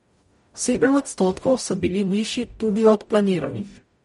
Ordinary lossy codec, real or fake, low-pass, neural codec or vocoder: MP3, 48 kbps; fake; 19.8 kHz; codec, 44.1 kHz, 0.9 kbps, DAC